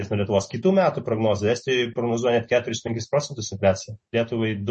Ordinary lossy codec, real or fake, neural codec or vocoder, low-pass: MP3, 32 kbps; real; none; 10.8 kHz